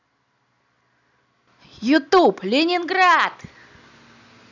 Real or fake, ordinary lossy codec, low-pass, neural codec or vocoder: real; none; 7.2 kHz; none